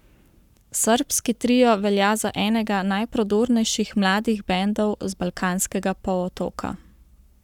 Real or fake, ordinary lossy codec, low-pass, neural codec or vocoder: fake; none; 19.8 kHz; codec, 44.1 kHz, 7.8 kbps, Pupu-Codec